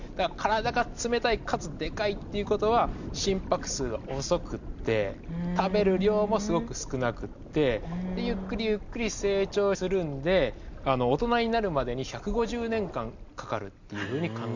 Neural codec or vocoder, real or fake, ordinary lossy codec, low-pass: none; real; none; 7.2 kHz